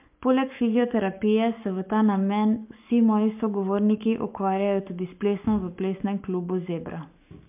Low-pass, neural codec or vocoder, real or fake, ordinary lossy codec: 3.6 kHz; codec, 44.1 kHz, 7.8 kbps, Pupu-Codec; fake; MP3, 32 kbps